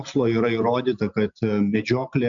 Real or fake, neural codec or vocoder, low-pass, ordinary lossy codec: real; none; 7.2 kHz; MP3, 64 kbps